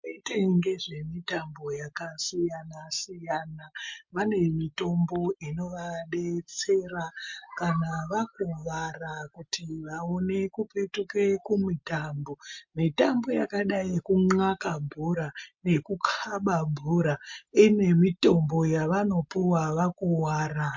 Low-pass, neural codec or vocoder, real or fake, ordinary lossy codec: 7.2 kHz; none; real; MP3, 48 kbps